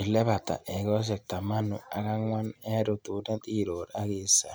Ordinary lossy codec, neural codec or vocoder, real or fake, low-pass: none; none; real; none